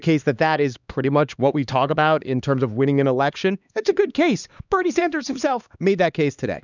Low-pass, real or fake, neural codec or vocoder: 7.2 kHz; fake; codec, 16 kHz, 2 kbps, X-Codec, HuBERT features, trained on LibriSpeech